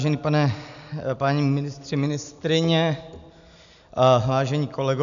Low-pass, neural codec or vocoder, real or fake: 7.2 kHz; none; real